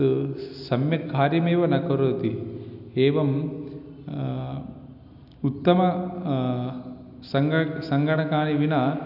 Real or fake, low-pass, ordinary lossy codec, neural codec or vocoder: real; 5.4 kHz; none; none